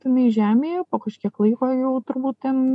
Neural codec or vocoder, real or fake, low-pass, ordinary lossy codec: none; real; 10.8 kHz; MP3, 96 kbps